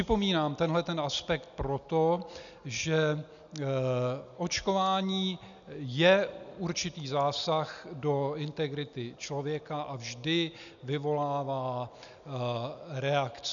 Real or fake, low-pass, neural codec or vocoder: real; 7.2 kHz; none